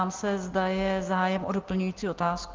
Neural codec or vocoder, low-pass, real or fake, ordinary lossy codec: none; 7.2 kHz; real; Opus, 16 kbps